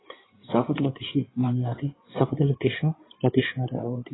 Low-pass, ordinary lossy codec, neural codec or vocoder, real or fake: 7.2 kHz; AAC, 16 kbps; codec, 16 kHz in and 24 kHz out, 2.2 kbps, FireRedTTS-2 codec; fake